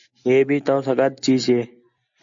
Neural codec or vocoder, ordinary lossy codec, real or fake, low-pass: none; AAC, 64 kbps; real; 7.2 kHz